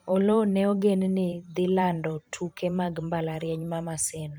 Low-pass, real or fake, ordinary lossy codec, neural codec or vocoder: none; fake; none; vocoder, 44.1 kHz, 128 mel bands every 512 samples, BigVGAN v2